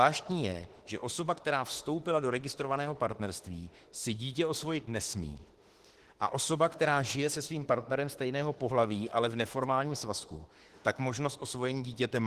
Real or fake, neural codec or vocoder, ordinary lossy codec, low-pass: fake; autoencoder, 48 kHz, 32 numbers a frame, DAC-VAE, trained on Japanese speech; Opus, 16 kbps; 14.4 kHz